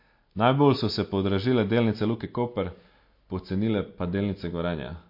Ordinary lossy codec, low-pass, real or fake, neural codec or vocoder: MP3, 32 kbps; 5.4 kHz; real; none